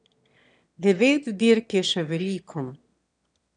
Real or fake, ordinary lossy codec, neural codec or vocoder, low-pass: fake; none; autoencoder, 22.05 kHz, a latent of 192 numbers a frame, VITS, trained on one speaker; 9.9 kHz